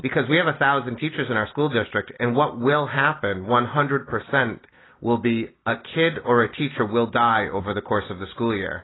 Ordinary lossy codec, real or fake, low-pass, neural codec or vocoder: AAC, 16 kbps; real; 7.2 kHz; none